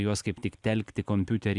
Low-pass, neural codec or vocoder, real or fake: 10.8 kHz; vocoder, 44.1 kHz, 128 mel bands every 256 samples, BigVGAN v2; fake